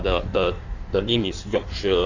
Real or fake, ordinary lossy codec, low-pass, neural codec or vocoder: fake; Opus, 64 kbps; 7.2 kHz; codec, 16 kHz in and 24 kHz out, 1.1 kbps, FireRedTTS-2 codec